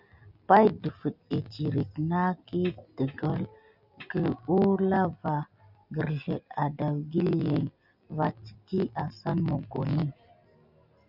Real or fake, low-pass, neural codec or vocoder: real; 5.4 kHz; none